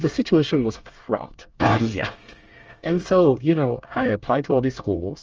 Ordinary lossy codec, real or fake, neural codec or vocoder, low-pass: Opus, 24 kbps; fake; codec, 24 kHz, 1 kbps, SNAC; 7.2 kHz